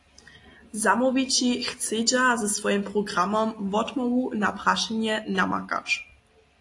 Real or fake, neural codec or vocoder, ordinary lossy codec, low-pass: real; none; AAC, 48 kbps; 10.8 kHz